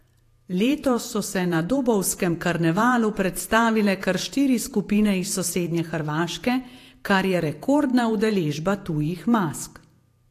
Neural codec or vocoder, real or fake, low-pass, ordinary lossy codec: vocoder, 48 kHz, 128 mel bands, Vocos; fake; 14.4 kHz; AAC, 64 kbps